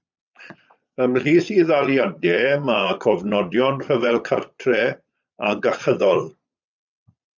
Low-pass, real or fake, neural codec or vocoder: 7.2 kHz; fake; vocoder, 22.05 kHz, 80 mel bands, Vocos